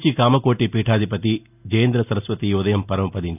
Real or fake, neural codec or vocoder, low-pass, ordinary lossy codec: real; none; 3.6 kHz; none